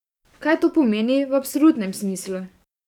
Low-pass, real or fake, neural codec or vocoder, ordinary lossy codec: 19.8 kHz; fake; codec, 44.1 kHz, 7.8 kbps, DAC; none